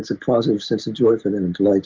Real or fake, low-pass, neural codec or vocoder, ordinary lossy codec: real; 7.2 kHz; none; Opus, 32 kbps